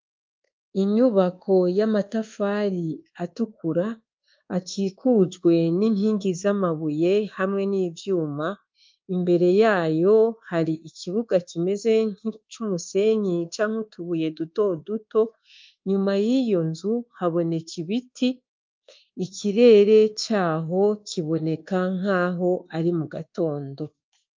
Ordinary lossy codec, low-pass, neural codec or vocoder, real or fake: Opus, 24 kbps; 7.2 kHz; codec, 24 kHz, 1.2 kbps, DualCodec; fake